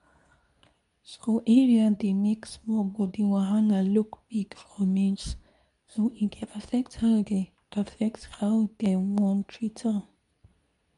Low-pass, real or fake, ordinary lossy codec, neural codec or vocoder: 10.8 kHz; fake; none; codec, 24 kHz, 0.9 kbps, WavTokenizer, medium speech release version 2